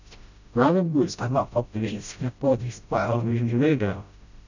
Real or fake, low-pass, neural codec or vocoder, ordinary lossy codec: fake; 7.2 kHz; codec, 16 kHz, 0.5 kbps, FreqCodec, smaller model; none